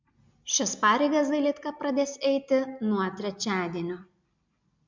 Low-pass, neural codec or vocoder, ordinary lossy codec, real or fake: 7.2 kHz; none; MP3, 64 kbps; real